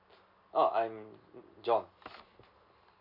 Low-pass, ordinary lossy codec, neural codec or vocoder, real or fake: 5.4 kHz; none; none; real